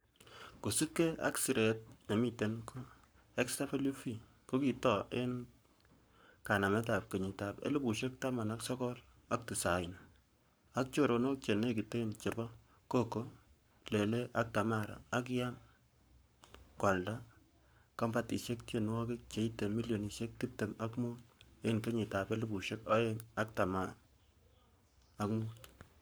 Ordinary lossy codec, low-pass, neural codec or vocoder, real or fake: none; none; codec, 44.1 kHz, 7.8 kbps, Pupu-Codec; fake